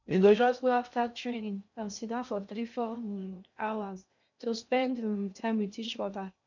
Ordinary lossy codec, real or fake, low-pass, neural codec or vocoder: none; fake; 7.2 kHz; codec, 16 kHz in and 24 kHz out, 0.6 kbps, FocalCodec, streaming, 2048 codes